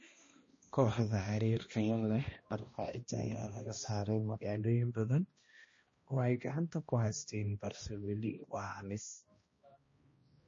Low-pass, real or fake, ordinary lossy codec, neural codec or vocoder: 7.2 kHz; fake; MP3, 32 kbps; codec, 16 kHz, 1 kbps, X-Codec, HuBERT features, trained on balanced general audio